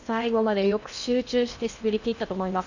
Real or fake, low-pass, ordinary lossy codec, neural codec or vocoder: fake; 7.2 kHz; none; codec, 16 kHz in and 24 kHz out, 0.8 kbps, FocalCodec, streaming, 65536 codes